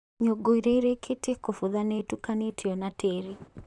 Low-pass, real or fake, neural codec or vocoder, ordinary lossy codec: 10.8 kHz; fake; vocoder, 44.1 kHz, 128 mel bands, Pupu-Vocoder; none